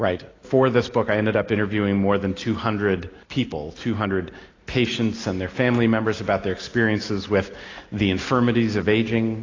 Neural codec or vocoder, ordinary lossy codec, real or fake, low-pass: none; AAC, 32 kbps; real; 7.2 kHz